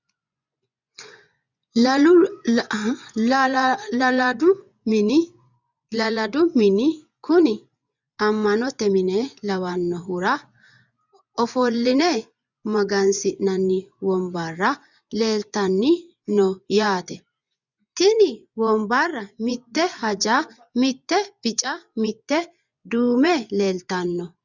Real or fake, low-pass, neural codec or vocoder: fake; 7.2 kHz; vocoder, 24 kHz, 100 mel bands, Vocos